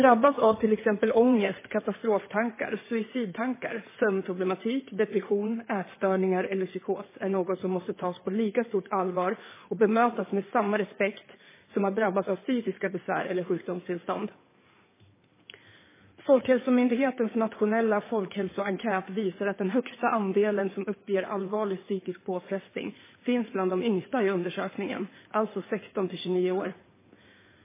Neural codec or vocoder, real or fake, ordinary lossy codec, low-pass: codec, 16 kHz in and 24 kHz out, 2.2 kbps, FireRedTTS-2 codec; fake; MP3, 16 kbps; 3.6 kHz